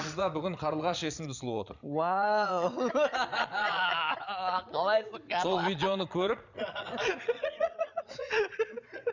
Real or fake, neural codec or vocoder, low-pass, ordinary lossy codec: fake; vocoder, 22.05 kHz, 80 mel bands, Vocos; 7.2 kHz; none